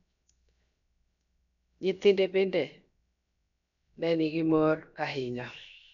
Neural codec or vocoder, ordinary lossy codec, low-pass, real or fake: codec, 16 kHz, 0.7 kbps, FocalCodec; none; 7.2 kHz; fake